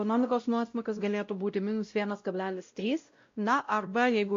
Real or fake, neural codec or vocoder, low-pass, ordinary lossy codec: fake; codec, 16 kHz, 0.5 kbps, X-Codec, WavLM features, trained on Multilingual LibriSpeech; 7.2 kHz; AAC, 48 kbps